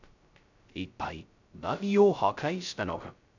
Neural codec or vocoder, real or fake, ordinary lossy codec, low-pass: codec, 16 kHz, 0.2 kbps, FocalCodec; fake; none; 7.2 kHz